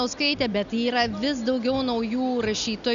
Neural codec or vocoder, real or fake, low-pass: none; real; 7.2 kHz